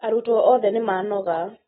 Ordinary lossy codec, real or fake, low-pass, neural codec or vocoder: AAC, 16 kbps; real; 19.8 kHz; none